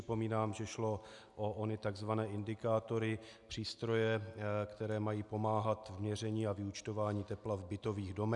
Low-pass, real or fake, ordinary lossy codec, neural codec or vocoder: 10.8 kHz; real; MP3, 96 kbps; none